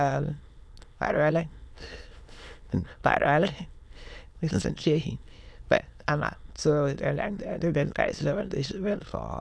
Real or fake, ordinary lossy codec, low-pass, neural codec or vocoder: fake; none; none; autoencoder, 22.05 kHz, a latent of 192 numbers a frame, VITS, trained on many speakers